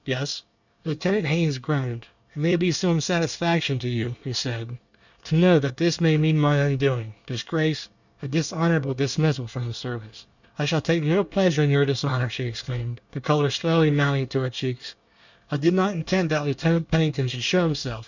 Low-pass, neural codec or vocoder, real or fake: 7.2 kHz; codec, 24 kHz, 1 kbps, SNAC; fake